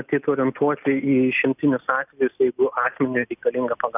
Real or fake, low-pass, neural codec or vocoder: real; 3.6 kHz; none